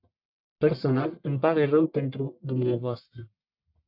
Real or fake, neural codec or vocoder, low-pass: fake; codec, 44.1 kHz, 1.7 kbps, Pupu-Codec; 5.4 kHz